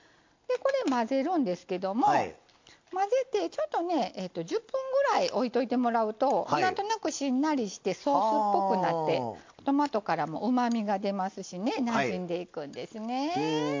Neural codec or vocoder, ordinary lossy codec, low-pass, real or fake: none; MP3, 48 kbps; 7.2 kHz; real